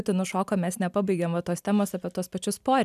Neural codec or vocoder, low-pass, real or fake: none; 14.4 kHz; real